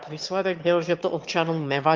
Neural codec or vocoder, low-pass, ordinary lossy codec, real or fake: autoencoder, 22.05 kHz, a latent of 192 numbers a frame, VITS, trained on one speaker; 7.2 kHz; Opus, 24 kbps; fake